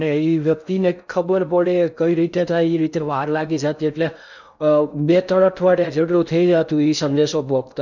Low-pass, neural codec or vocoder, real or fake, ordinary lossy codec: 7.2 kHz; codec, 16 kHz in and 24 kHz out, 0.6 kbps, FocalCodec, streaming, 2048 codes; fake; none